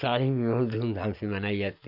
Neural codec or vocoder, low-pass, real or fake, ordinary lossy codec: none; 5.4 kHz; real; none